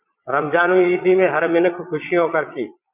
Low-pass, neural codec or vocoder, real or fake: 3.6 kHz; vocoder, 22.05 kHz, 80 mel bands, Vocos; fake